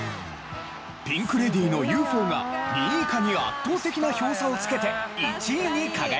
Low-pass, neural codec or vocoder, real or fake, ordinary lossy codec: none; none; real; none